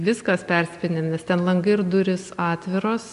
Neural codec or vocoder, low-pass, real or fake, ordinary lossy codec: none; 10.8 kHz; real; MP3, 64 kbps